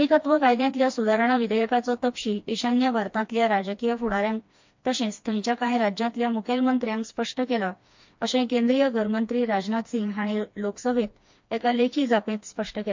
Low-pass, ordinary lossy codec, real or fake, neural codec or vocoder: 7.2 kHz; MP3, 48 kbps; fake; codec, 16 kHz, 2 kbps, FreqCodec, smaller model